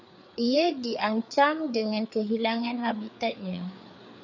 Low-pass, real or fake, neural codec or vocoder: 7.2 kHz; fake; codec, 16 kHz, 4 kbps, FreqCodec, larger model